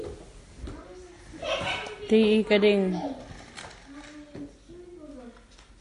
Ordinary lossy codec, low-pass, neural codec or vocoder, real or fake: AAC, 48 kbps; 10.8 kHz; none; real